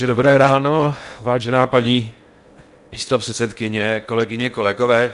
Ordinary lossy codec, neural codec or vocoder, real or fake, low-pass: MP3, 64 kbps; codec, 16 kHz in and 24 kHz out, 0.8 kbps, FocalCodec, streaming, 65536 codes; fake; 10.8 kHz